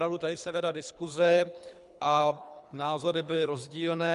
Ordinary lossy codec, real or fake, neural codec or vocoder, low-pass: MP3, 96 kbps; fake; codec, 24 kHz, 3 kbps, HILCodec; 10.8 kHz